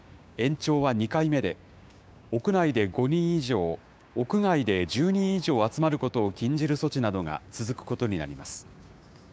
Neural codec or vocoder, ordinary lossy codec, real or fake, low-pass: codec, 16 kHz, 6 kbps, DAC; none; fake; none